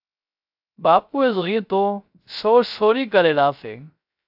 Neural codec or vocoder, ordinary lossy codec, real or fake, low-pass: codec, 16 kHz, 0.3 kbps, FocalCodec; AAC, 48 kbps; fake; 5.4 kHz